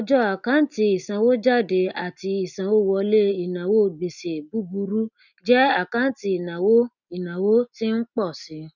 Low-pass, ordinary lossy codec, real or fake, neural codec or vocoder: 7.2 kHz; none; real; none